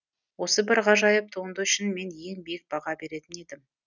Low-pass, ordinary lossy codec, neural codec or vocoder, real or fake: 7.2 kHz; none; none; real